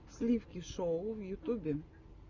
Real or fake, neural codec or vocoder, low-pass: real; none; 7.2 kHz